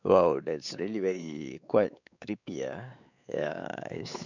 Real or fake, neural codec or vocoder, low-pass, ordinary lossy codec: fake; codec, 16 kHz, 4 kbps, X-Codec, WavLM features, trained on Multilingual LibriSpeech; 7.2 kHz; none